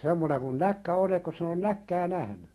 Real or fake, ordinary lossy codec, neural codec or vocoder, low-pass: fake; AAC, 32 kbps; autoencoder, 48 kHz, 128 numbers a frame, DAC-VAE, trained on Japanese speech; 19.8 kHz